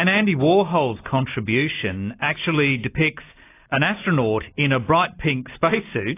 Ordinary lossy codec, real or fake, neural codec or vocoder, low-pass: AAC, 24 kbps; real; none; 3.6 kHz